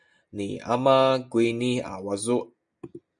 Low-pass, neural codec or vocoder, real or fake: 9.9 kHz; none; real